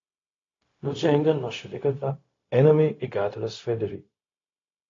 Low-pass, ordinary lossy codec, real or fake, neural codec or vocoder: 7.2 kHz; AAC, 32 kbps; fake; codec, 16 kHz, 0.4 kbps, LongCat-Audio-Codec